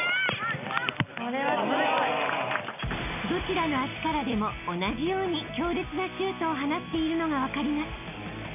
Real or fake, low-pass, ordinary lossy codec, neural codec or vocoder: real; 3.6 kHz; none; none